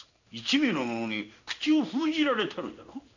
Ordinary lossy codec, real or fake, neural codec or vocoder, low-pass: none; fake; codec, 16 kHz in and 24 kHz out, 1 kbps, XY-Tokenizer; 7.2 kHz